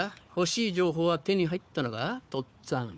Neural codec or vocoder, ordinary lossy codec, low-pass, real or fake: codec, 16 kHz, 4 kbps, FunCodec, trained on Chinese and English, 50 frames a second; none; none; fake